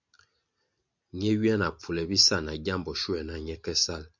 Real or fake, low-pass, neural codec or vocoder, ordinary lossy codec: real; 7.2 kHz; none; MP3, 64 kbps